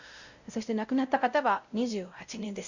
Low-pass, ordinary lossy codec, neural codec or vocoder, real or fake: 7.2 kHz; Opus, 64 kbps; codec, 16 kHz, 0.5 kbps, X-Codec, WavLM features, trained on Multilingual LibriSpeech; fake